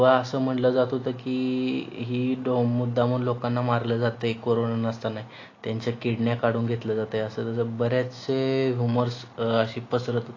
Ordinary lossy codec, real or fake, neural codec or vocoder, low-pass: AAC, 32 kbps; real; none; 7.2 kHz